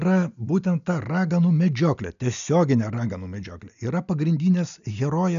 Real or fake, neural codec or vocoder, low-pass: real; none; 7.2 kHz